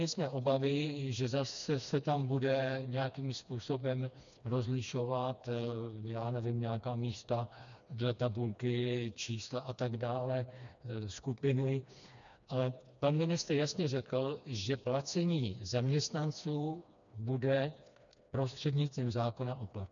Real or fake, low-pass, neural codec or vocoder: fake; 7.2 kHz; codec, 16 kHz, 2 kbps, FreqCodec, smaller model